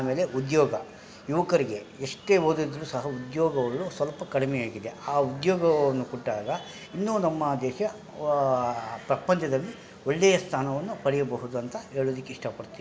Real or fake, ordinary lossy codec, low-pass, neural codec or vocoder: real; none; none; none